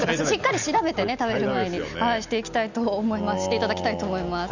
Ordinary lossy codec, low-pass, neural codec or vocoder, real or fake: none; 7.2 kHz; none; real